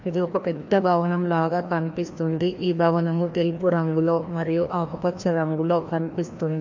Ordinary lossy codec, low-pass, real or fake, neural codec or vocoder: MP3, 48 kbps; 7.2 kHz; fake; codec, 16 kHz, 1 kbps, FreqCodec, larger model